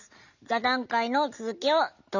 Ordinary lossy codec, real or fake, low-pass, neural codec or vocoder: none; real; 7.2 kHz; none